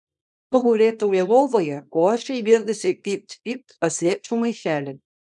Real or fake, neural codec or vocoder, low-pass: fake; codec, 24 kHz, 0.9 kbps, WavTokenizer, small release; 10.8 kHz